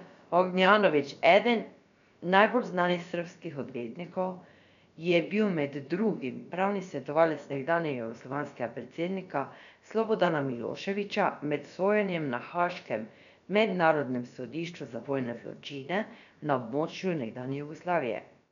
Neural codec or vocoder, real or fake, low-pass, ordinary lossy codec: codec, 16 kHz, about 1 kbps, DyCAST, with the encoder's durations; fake; 7.2 kHz; none